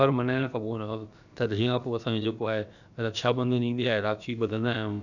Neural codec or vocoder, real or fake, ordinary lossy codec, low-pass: codec, 16 kHz, about 1 kbps, DyCAST, with the encoder's durations; fake; none; 7.2 kHz